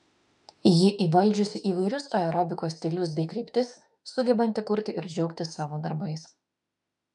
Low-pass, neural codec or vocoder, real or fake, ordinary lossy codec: 10.8 kHz; autoencoder, 48 kHz, 32 numbers a frame, DAC-VAE, trained on Japanese speech; fake; MP3, 96 kbps